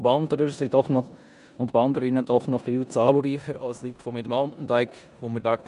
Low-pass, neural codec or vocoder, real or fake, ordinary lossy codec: 10.8 kHz; codec, 16 kHz in and 24 kHz out, 0.9 kbps, LongCat-Audio-Codec, four codebook decoder; fake; none